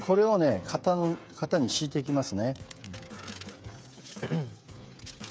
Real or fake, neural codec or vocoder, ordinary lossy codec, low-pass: fake; codec, 16 kHz, 8 kbps, FreqCodec, smaller model; none; none